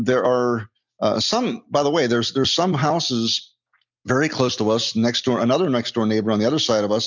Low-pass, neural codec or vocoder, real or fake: 7.2 kHz; none; real